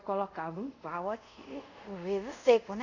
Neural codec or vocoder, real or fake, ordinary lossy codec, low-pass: codec, 24 kHz, 0.5 kbps, DualCodec; fake; none; 7.2 kHz